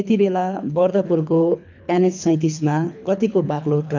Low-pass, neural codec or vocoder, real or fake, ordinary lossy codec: 7.2 kHz; codec, 24 kHz, 3 kbps, HILCodec; fake; none